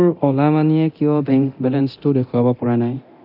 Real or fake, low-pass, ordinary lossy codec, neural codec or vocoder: fake; 5.4 kHz; none; codec, 24 kHz, 0.9 kbps, DualCodec